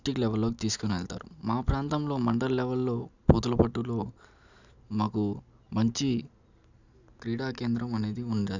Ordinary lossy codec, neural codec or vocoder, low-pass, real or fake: none; none; 7.2 kHz; real